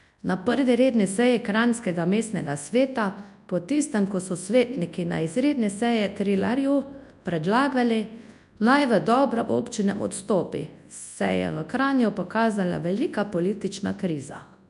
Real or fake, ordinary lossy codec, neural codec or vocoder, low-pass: fake; none; codec, 24 kHz, 0.9 kbps, WavTokenizer, large speech release; 10.8 kHz